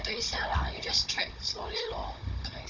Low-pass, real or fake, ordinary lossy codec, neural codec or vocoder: 7.2 kHz; fake; none; codec, 16 kHz, 16 kbps, FunCodec, trained on Chinese and English, 50 frames a second